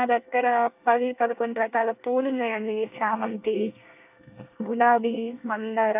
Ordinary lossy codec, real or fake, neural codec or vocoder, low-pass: none; fake; codec, 24 kHz, 1 kbps, SNAC; 3.6 kHz